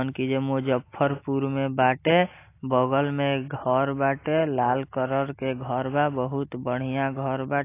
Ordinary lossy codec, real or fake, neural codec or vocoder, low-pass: AAC, 24 kbps; real; none; 3.6 kHz